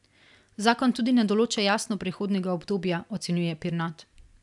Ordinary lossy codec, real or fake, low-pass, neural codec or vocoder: none; real; 10.8 kHz; none